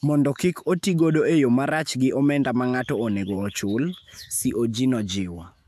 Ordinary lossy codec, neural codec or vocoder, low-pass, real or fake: AAC, 96 kbps; autoencoder, 48 kHz, 128 numbers a frame, DAC-VAE, trained on Japanese speech; 14.4 kHz; fake